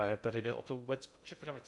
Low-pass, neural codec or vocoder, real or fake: 10.8 kHz; codec, 16 kHz in and 24 kHz out, 0.6 kbps, FocalCodec, streaming, 2048 codes; fake